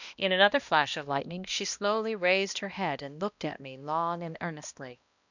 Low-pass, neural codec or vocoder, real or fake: 7.2 kHz; codec, 16 kHz, 1 kbps, X-Codec, HuBERT features, trained on balanced general audio; fake